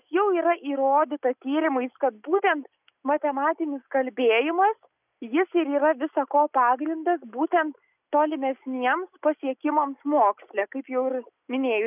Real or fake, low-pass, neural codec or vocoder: real; 3.6 kHz; none